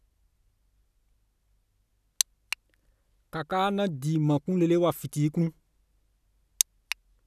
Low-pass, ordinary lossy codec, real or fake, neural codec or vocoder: 14.4 kHz; none; real; none